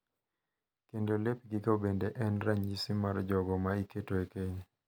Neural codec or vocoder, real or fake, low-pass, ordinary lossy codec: none; real; none; none